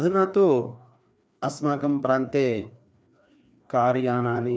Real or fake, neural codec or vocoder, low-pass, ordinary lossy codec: fake; codec, 16 kHz, 2 kbps, FreqCodec, larger model; none; none